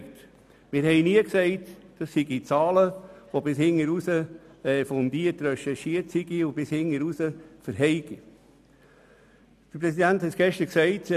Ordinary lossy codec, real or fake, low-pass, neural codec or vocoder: none; real; 14.4 kHz; none